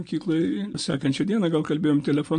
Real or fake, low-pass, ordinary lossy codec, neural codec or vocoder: real; 9.9 kHz; MP3, 48 kbps; none